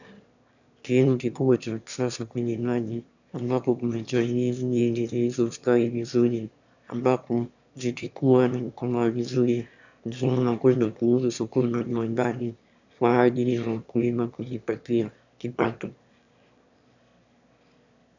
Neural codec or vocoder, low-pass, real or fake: autoencoder, 22.05 kHz, a latent of 192 numbers a frame, VITS, trained on one speaker; 7.2 kHz; fake